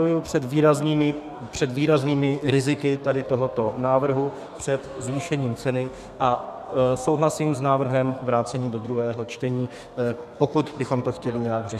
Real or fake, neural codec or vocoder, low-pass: fake; codec, 32 kHz, 1.9 kbps, SNAC; 14.4 kHz